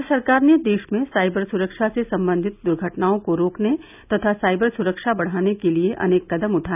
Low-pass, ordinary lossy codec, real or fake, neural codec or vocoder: 3.6 kHz; none; real; none